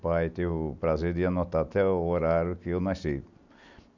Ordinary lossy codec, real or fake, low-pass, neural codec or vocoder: none; real; 7.2 kHz; none